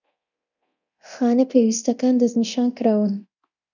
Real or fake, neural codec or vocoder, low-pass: fake; codec, 24 kHz, 0.9 kbps, DualCodec; 7.2 kHz